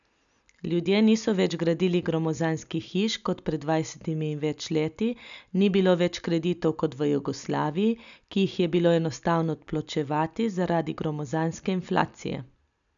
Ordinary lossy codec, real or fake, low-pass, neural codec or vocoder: none; real; 7.2 kHz; none